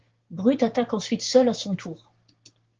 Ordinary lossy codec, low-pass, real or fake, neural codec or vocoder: Opus, 16 kbps; 7.2 kHz; fake; codec, 16 kHz, 6 kbps, DAC